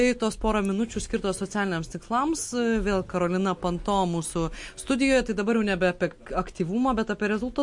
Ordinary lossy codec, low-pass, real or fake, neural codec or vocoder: MP3, 48 kbps; 10.8 kHz; real; none